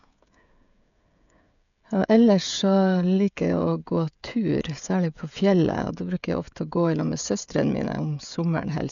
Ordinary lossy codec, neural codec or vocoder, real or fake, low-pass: none; codec, 16 kHz, 16 kbps, FreqCodec, smaller model; fake; 7.2 kHz